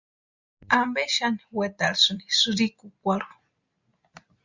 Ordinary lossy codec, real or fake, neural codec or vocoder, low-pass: Opus, 64 kbps; real; none; 7.2 kHz